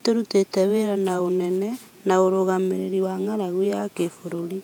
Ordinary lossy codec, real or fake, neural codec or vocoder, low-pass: none; fake; vocoder, 48 kHz, 128 mel bands, Vocos; 19.8 kHz